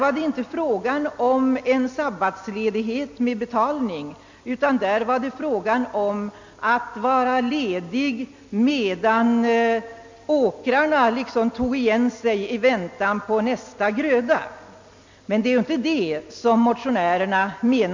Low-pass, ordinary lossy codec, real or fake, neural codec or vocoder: 7.2 kHz; MP3, 48 kbps; real; none